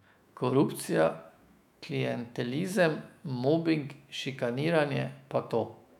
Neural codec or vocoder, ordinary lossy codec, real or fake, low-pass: autoencoder, 48 kHz, 128 numbers a frame, DAC-VAE, trained on Japanese speech; none; fake; 19.8 kHz